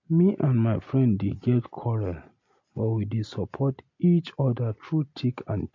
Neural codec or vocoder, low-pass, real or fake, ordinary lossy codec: none; 7.2 kHz; real; MP3, 48 kbps